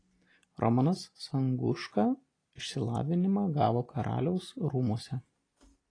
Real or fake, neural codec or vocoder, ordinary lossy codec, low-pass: real; none; AAC, 32 kbps; 9.9 kHz